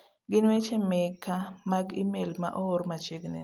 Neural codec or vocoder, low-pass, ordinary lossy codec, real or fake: none; 19.8 kHz; Opus, 32 kbps; real